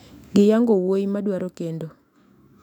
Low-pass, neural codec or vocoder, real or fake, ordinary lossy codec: 19.8 kHz; autoencoder, 48 kHz, 128 numbers a frame, DAC-VAE, trained on Japanese speech; fake; none